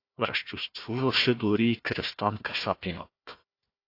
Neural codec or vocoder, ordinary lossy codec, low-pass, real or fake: codec, 16 kHz, 1 kbps, FunCodec, trained on Chinese and English, 50 frames a second; AAC, 32 kbps; 5.4 kHz; fake